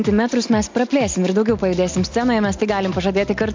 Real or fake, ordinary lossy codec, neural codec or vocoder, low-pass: real; MP3, 48 kbps; none; 7.2 kHz